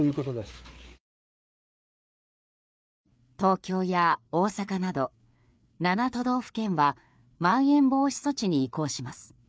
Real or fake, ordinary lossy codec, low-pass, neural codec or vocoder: fake; none; none; codec, 16 kHz, 4 kbps, FreqCodec, larger model